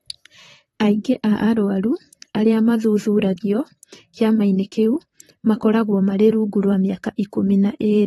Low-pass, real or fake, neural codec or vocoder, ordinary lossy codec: 19.8 kHz; fake; vocoder, 44.1 kHz, 128 mel bands, Pupu-Vocoder; AAC, 32 kbps